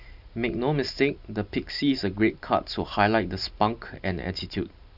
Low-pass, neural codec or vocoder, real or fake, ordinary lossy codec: 5.4 kHz; vocoder, 44.1 kHz, 128 mel bands every 512 samples, BigVGAN v2; fake; none